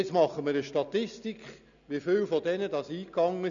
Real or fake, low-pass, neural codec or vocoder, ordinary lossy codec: real; 7.2 kHz; none; none